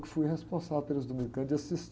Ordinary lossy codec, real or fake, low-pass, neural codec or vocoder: none; real; none; none